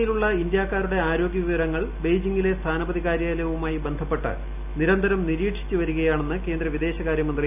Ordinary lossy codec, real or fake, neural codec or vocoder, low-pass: none; real; none; 3.6 kHz